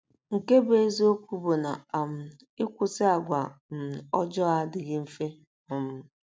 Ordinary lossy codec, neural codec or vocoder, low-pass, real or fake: none; none; none; real